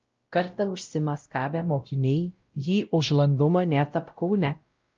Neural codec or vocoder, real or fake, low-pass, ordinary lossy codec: codec, 16 kHz, 0.5 kbps, X-Codec, WavLM features, trained on Multilingual LibriSpeech; fake; 7.2 kHz; Opus, 24 kbps